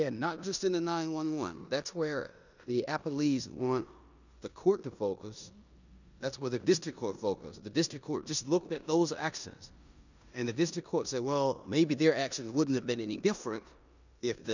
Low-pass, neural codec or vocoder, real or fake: 7.2 kHz; codec, 16 kHz in and 24 kHz out, 0.9 kbps, LongCat-Audio-Codec, four codebook decoder; fake